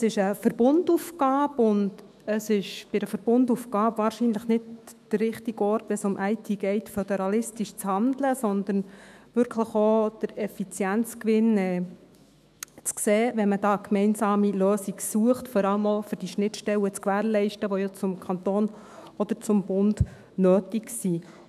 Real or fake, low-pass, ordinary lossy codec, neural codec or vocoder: fake; 14.4 kHz; none; autoencoder, 48 kHz, 128 numbers a frame, DAC-VAE, trained on Japanese speech